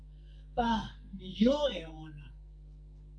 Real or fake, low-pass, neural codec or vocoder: fake; 9.9 kHz; codec, 44.1 kHz, 2.6 kbps, SNAC